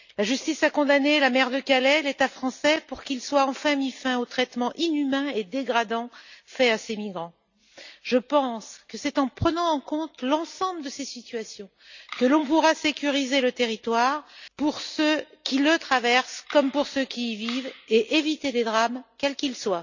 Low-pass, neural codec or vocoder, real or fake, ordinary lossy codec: 7.2 kHz; none; real; none